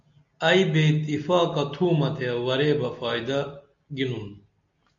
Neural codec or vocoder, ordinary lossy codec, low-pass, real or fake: none; AAC, 64 kbps; 7.2 kHz; real